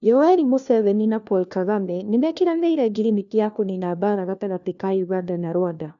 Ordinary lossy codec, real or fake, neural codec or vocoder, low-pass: none; fake; codec, 16 kHz, 1 kbps, FunCodec, trained on LibriTTS, 50 frames a second; 7.2 kHz